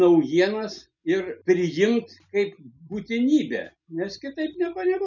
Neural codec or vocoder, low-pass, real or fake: none; 7.2 kHz; real